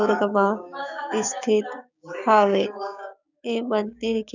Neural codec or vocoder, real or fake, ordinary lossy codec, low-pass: codec, 16 kHz, 6 kbps, DAC; fake; none; 7.2 kHz